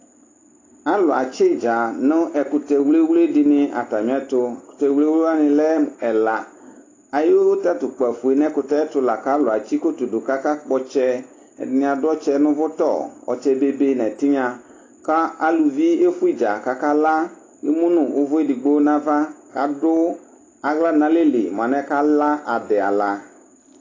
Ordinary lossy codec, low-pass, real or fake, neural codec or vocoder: AAC, 32 kbps; 7.2 kHz; real; none